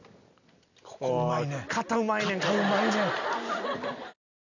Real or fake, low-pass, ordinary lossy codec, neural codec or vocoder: real; 7.2 kHz; none; none